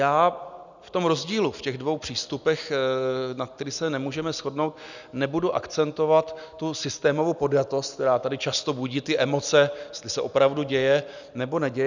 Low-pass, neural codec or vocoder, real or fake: 7.2 kHz; none; real